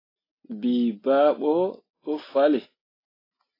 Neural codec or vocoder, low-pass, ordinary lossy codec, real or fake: none; 5.4 kHz; AAC, 24 kbps; real